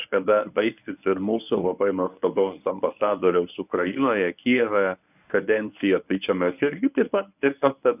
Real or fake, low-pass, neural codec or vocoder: fake; 3.6 kHz; codec, 24 kHz, 0.9 kbps, WavTokenizer, medium speech release version 1